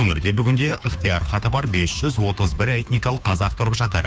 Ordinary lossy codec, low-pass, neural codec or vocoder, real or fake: none; none; codec, 16 kHz, 2 kbps, FunCodec, trained on Chinese and English, 25 frames a second; fake